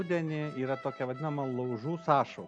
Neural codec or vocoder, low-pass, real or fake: none; 9.9 kHz; real